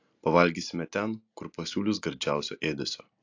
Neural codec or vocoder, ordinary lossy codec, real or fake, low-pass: none; AAC, 48 kbps; real; 7.2 kHz